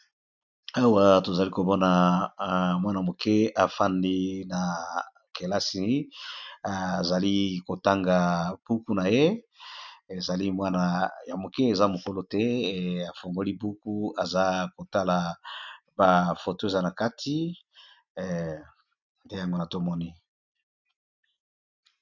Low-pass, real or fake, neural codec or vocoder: 7.2 kHz; real; none